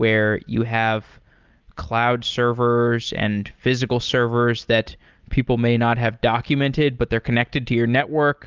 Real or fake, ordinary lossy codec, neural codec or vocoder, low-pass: real; Opus, 24 kbps; none; 7.2 kHz